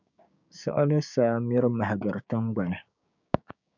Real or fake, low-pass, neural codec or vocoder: fake; 7.2 kHz; codec, 16 kHz, 6 kbps, DAC